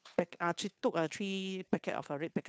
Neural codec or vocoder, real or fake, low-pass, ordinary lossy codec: codec, 16 kHz, 2 kbps, FunCodec, trained on Chinese and English, 25 frames a second; fake; none; none